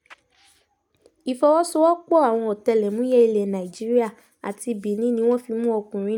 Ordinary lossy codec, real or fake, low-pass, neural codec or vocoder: none; real; 19.8 kHz; none